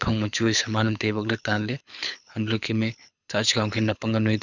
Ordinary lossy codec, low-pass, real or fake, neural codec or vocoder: none; 7.2 kHz; fake; codec, 24 kHz, 6 kbps, HILCodec